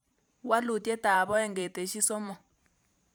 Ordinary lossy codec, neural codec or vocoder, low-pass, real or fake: none; vocoder, 44.1 kHz, 128 mel bands every 512 samples, BigVGAN v2; none; fake